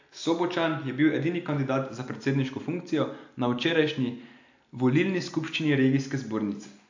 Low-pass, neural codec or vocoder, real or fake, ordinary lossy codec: 7.2 kHz; none; real; AAC, 48 kbps